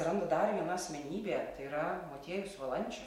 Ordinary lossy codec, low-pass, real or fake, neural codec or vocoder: MP3, 64 kbps; 19.8 kHz; real; none